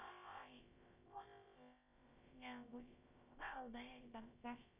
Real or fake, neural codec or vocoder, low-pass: fake; codec, 16 kHz, about 1 kbps, DyCAST, with the encoder's durations; 3.6 kHz